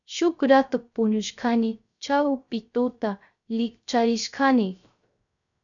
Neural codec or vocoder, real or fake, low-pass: codec, 16 kHz, 0.3 kbps, FocalCodec; fake; 7.2 kHz